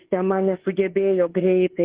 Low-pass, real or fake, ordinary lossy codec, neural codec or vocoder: 3.6 kHz; fake; Opus, 16 kbps; autoencoder, 48 kHz, 32 numbers a frame, DAC-VAE, trained on Japanese speech